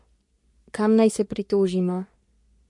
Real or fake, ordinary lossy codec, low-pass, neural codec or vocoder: fake; MP3, 64 kbps; 10.8 kHz; codec, 44.1 kHz, 3.4 kbps, Pupu-Codec